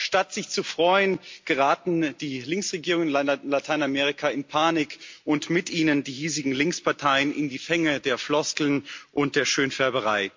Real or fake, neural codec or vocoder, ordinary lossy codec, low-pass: real; none; MP3, 48 kbps; 7.2 kHz